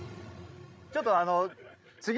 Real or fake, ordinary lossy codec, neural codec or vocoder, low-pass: fake; none; codec, 16 kHz, 16 kbps, FreqCodec, larger model; none